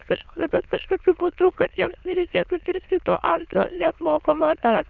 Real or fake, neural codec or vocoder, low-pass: fake; autoencoder, 22.05 kHz, a latent of 192 numbers a frame, VITS, trained on many speakers; 7.2 kHz